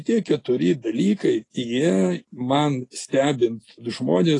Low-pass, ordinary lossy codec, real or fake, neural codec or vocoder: 10.8 kHz; AAC, 32 kbps; real; none